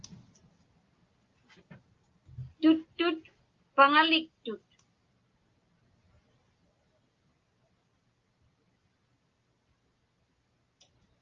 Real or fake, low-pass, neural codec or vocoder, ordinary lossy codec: real; 7.2 kHz; none; Opus, 32 kbps